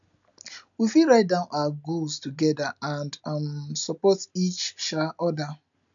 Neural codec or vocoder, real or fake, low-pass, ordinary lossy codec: none; real; 7.2 kHz; none